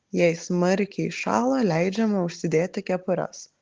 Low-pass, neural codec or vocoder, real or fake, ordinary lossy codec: 7.2 kHz; none; real; Opus, 16 kbps